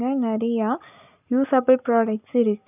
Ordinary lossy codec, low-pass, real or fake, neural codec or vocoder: none; 3.6 kHz; real; none